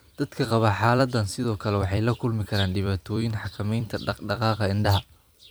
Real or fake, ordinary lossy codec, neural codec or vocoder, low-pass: fake; none; vocoder, 44.1 kHz, 128 mel bands every 256 samples, BigVGAN v2; none